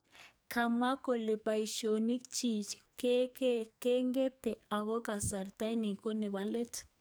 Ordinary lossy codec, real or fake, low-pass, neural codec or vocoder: none; fake; none; codec, 44.1 kHz, 2.6 kbps, SNAC